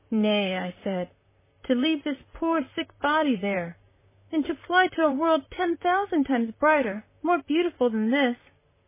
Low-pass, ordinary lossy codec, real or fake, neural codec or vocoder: 3.6 kHz; MP3, 16 kbps; fake; vocoder, 44.1 kHz, 128 mel bands, Pupu-Vocoder